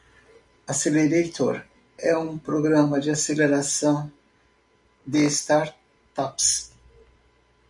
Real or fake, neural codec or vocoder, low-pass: real; none; 10.8 kHz